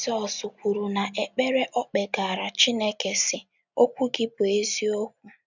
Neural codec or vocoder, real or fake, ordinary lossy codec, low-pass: none; real; none; 7.2 kHz